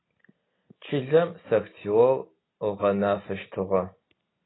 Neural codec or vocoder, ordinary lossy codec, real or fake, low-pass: none; AAC, 16 kbps; real; 7.2 kHz